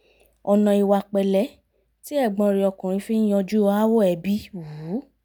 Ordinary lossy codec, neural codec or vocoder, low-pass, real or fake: none; none; none; real